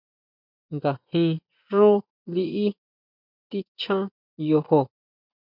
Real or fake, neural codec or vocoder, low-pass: fake; vocoder, 24 kHz, 100 mel bands, Vocos; 5.4 kHz